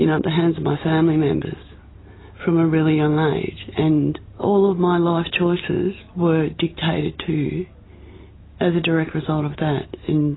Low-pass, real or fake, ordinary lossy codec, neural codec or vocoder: 7.2 kHz; real; AAC, 16 kbps; none